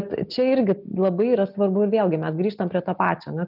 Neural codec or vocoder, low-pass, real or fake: none; 5.4 kHz; real